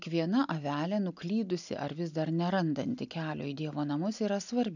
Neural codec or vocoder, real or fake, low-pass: none; real; 7.2 kHz